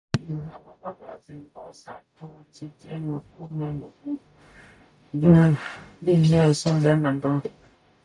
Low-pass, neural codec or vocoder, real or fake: 10.8 kHz; codec, 44.1 kHz, 0.9 kbps, DAC; fake